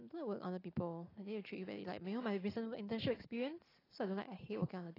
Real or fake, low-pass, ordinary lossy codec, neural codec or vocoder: real; 5.4 kHz; AAC, 24 kbps; none